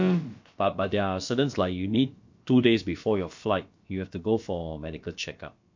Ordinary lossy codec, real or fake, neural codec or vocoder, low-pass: MP3, 48 kbps; fake; codec, 16 kHz, about 1 kbps, DyCAST, with the encoder's durations; 7.2 kHz